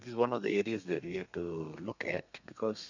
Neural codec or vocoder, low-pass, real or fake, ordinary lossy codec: codec, 32 kHz, 1.9 kbps, SNAC; 7.2 kHz; fake; none